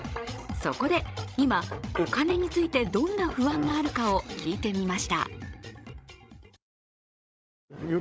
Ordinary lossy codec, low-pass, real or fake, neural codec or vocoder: none; none; fake; codec, 16 kHz, 16 kbps, FreqCodec, larger model